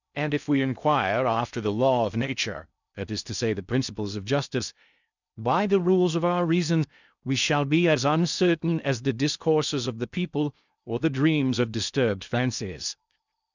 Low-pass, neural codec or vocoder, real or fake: 7.2 kHz; codec, 16 kHz in and 24 kHz out, 0.6 kbps, FocalCodec, streaming, 2048 codes; fake